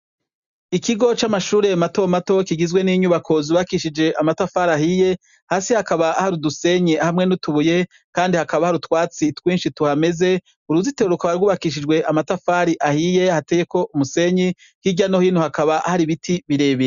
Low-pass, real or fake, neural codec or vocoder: 7.2 kHz; real; none